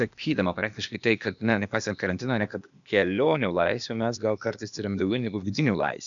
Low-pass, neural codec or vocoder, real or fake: 7.2 kHz; codec, 16 kHz, 0.8 kbps, ZipCodec; fake